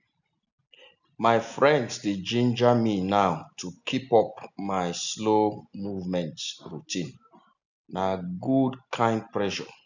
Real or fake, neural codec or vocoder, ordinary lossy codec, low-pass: real; none; none; 7.2 kHz